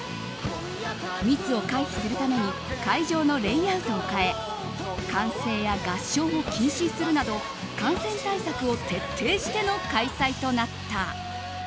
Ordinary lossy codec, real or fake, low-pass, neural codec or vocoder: none; real; none; none